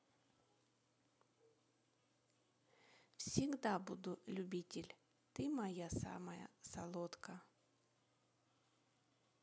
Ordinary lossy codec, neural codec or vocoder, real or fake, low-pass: none; none; real; none